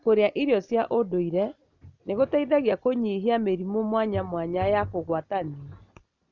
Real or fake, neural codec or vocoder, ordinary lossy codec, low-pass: real; none; Opus, 64 kbps; 7.2 kHz